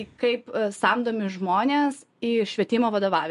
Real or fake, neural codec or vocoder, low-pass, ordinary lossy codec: real; none; 10.8 kHz; MP3, 64 kbps